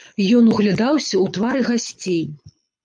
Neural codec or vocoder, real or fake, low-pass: codec, 24 kHz, 6 kbps, HILCodec; fake; 9.9 kHz